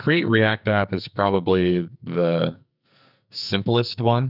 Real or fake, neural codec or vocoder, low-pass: fake; codec, 44.1 kHz, 2.6 kbps, SNAC; 5.4 kHz